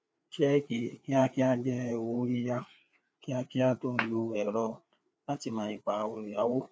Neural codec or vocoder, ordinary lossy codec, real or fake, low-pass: codec, 16 kHz, 4 kbps, FreqCodec, larger model; none; fake; none